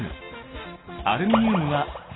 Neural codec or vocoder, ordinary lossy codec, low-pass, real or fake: none; AAC, 16 kbps; 7.2 kHz; real